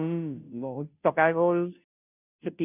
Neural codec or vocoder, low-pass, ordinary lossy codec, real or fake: codec, 16 kHz, 0.5 kbps, FunCodec, trained on Chinese and English, 25 frames a second; 3.6 kHz; none; fake